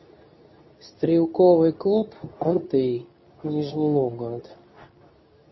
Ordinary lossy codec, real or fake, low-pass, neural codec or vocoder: MP3, 24 kbps; fake; 7.2 kHz; codec, 24 kHz, 0.9 kbps, WavTokenizer, medium speech release version 2